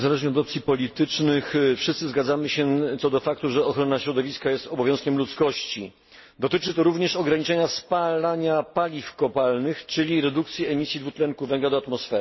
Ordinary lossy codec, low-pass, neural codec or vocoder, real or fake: MP3, 24 kbps; 7.2 kHz; none; real